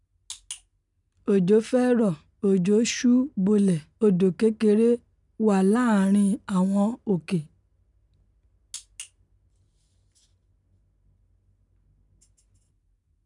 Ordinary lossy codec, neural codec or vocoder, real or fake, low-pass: none; none; real; 10.8 kHz